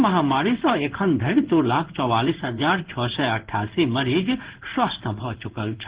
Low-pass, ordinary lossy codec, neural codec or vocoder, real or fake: 3.6 kHz; Opus, 16 kbps; codec, 16 kHz in and 24 kHz out, 1 kbps, XY-Tokenizer; fake